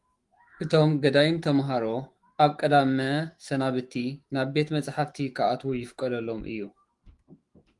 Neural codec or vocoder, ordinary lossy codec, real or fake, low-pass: autoencoder, 48 kHz, 128 numbers a frame, DAC-VAE, trained on Japanese speech; Opus, 24 kbps; fake; 10.8 kHz